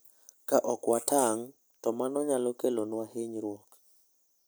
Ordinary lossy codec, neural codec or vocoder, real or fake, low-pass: none; none; real; none